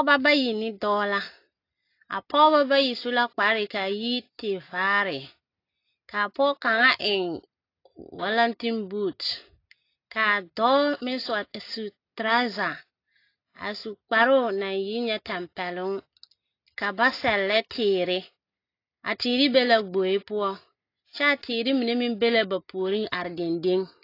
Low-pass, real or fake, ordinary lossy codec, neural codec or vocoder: 5.4 kHz; real; AAC, 32 kbps; none